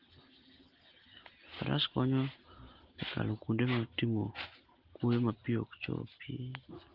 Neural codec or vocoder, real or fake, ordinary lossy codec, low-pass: none; real; Opus, 16 kbps; 5.4 kHz